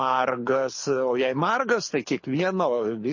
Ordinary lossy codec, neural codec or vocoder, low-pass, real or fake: MP3, 32 kbps; codec, 24 kHz, 6 kbps, HILCodec; 7.2 kHz; fake